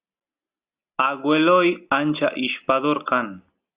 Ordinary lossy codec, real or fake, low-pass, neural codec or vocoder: Opus, 64 kbps; real; 3.6 kHz; none